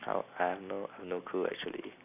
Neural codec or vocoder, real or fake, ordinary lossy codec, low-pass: none; real; none; 3.6 kHz